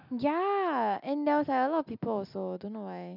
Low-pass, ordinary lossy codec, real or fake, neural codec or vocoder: 5.4 kHz; none; real; none